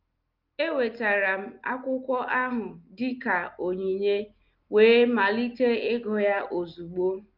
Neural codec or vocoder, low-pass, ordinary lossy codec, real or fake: none; 5.4 kHz; Opus, 24 kbps; real